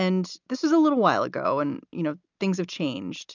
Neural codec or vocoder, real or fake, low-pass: none; real; 7.2 kHz